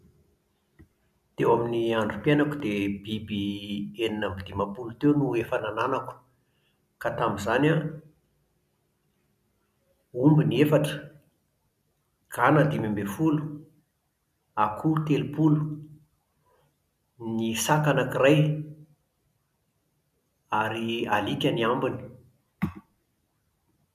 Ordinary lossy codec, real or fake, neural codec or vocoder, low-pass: none; real; none; 14.4 kHz